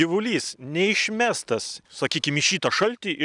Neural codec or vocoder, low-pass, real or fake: none; 10.8 kHz; real